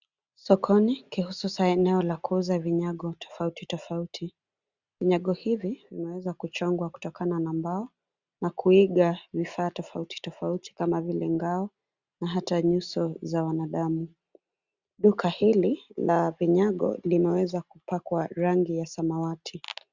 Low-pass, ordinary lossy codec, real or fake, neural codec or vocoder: 7.2 kHz; Opus, 64 kbps; real; none